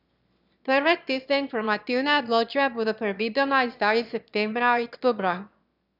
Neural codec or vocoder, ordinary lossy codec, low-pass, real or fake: autoencoder, 22.05 kHz, a latent of 192 numbers a frame, VITS, trained on one speaker; none; 5.4 kHz; fake